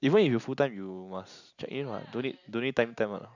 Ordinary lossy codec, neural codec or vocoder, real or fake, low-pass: none; none; real; 7.2 kHz